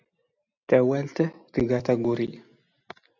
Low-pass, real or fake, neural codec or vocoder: 7.2 kHz; real; none